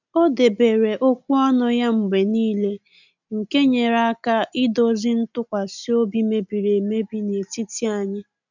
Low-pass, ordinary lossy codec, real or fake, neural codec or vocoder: 7.2 kHz; none; real; none